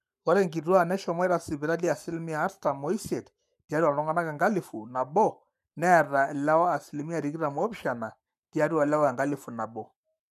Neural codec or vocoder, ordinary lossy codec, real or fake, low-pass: codec, 44.1 kHz, 7.8 kbps, Pupu-Codec; none; fake; 14.4 kHz